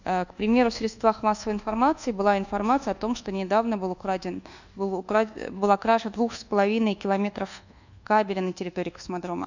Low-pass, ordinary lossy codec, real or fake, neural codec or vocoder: 7.2 kHz; none; fake; codec, 24 kHz, 1.2 kbps, DualCodec